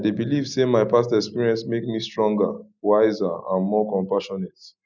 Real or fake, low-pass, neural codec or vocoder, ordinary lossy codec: real; 7.2 kHz; none; none